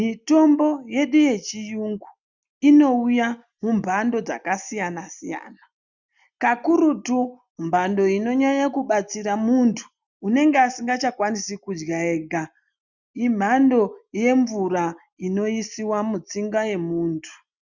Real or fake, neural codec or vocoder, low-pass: real; none; 7.2 kHz